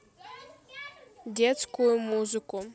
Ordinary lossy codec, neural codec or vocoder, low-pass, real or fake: none; none; none; real